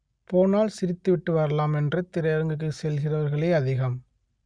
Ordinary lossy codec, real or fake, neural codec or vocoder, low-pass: Opus, 64 kbps; real; none; 9.9 kHz